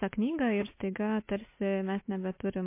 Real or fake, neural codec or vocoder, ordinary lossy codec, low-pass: real; none; MP3, 24 kbps; 3.6 kHz